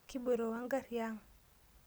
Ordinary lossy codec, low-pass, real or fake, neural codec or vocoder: none; none; real; none